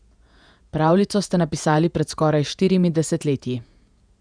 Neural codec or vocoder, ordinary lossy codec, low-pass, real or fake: vocoder, 48 kHz, 128 mel bands, Vocos; none; 9.9 kHz; fake